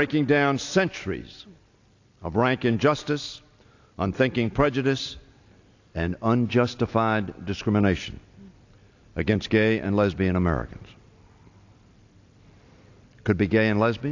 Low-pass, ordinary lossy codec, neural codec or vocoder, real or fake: 7.2 kHz; AAC, 48 kbps; none; real